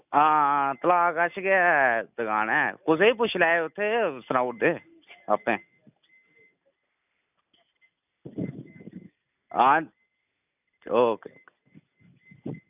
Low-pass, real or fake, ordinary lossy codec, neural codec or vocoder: 3.6 kHz; real; none; none